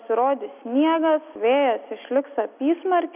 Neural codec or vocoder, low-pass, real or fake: none; 3.6 kHz; real